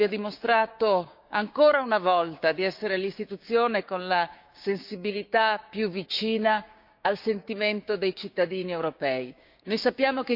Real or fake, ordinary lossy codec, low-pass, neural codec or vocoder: fake; none; 5.4 kHz; codec, 16 kHz, 6 kbps, DAC